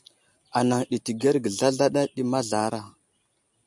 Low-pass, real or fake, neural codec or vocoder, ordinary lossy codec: 10.8 kHz; real; none; MP3, 96 kbps